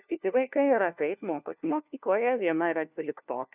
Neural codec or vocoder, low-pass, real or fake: codec, 16 kHz, 1 kbps, FunCodec, trained on LibriTTS, 50 frames a second; 3.6 kHz; fake